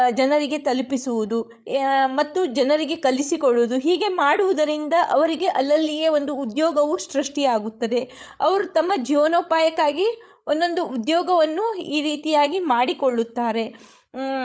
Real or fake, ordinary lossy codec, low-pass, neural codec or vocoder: fake; none; none; codec, 16 kHz, 8 kbps, FreqCodec, larger model